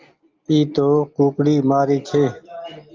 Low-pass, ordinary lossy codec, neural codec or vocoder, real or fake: 7.2 kHz; Opus, 24 kbps; none; real